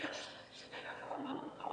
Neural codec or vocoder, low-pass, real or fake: autoencoder, 22.05 kHz, a latent of 192 numbers a frame, VITS, trained on one speaker; 9.9 kHz; fake